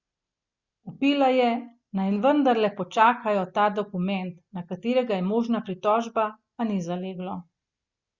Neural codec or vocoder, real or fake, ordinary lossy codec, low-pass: none; real; Opus, 64 kbps; 7.2 kHz